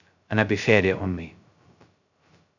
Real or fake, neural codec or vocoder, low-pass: fake; codec, 16 kHz, 0.2 kbps, FocalCodec; 7.2 kHz